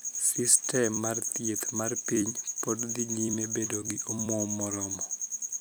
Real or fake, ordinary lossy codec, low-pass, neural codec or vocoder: fake; none; none; vocoder, 44.1 kHz, 128 mel bands every 256 samples, BigVGAN v2